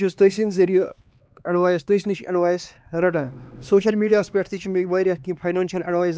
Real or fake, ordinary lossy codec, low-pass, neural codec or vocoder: fake; none; none; codec, 16 kHz, 2 kbps, X-Codec, HuBERT features, trained on LibriSpeech